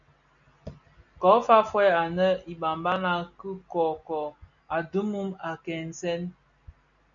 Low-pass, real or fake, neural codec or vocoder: 7.2 kHz; real; none